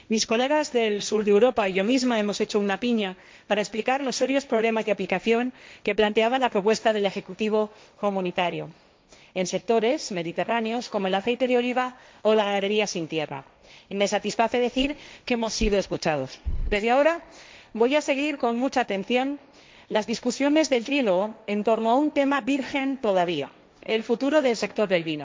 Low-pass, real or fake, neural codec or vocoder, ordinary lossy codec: none; fake; codec, 16 kHz, 1.1 kbps, Voila-Tokenizer; none